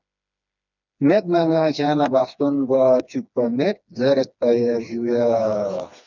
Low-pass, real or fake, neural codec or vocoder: 7.2 kHz; fake; codec, 16 kHz, 2 kbps, FreqCodec, smaller model